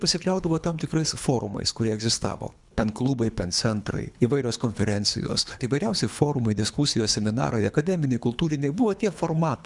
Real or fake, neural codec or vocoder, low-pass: fake; codec, 24 kHz, 3 kbps, HILCodec; 10.8 kHz